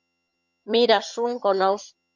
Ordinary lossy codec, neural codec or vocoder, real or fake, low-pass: MP3, 48 kbps; vocoder, 22.05 kHz, 80 mel bands, HiFi-GAN; fake; 7.2 kHz